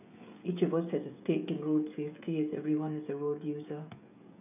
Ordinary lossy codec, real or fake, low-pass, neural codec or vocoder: none; fake; 3.6 kHz; codec, 16 kHz, 16 kbps, FreqCodec, smaller model